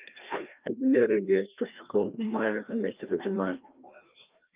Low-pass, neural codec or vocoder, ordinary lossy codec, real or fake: 3.6 kHz; codec, 16 kHz, 1 kbps, FreqCodec, larger model; Opus, 24 kbps; fake